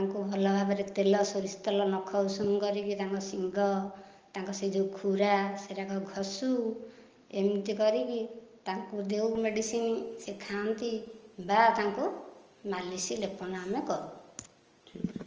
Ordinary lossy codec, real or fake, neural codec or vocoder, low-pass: Opus, 24 kbps; real; none; 7.2 kHz